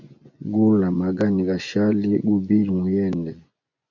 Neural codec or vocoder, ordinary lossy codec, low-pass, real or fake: vocoder, 24 kHz, 100 mel bands, Vocos; MP3, 64 kbps; 7.2 kHz; fake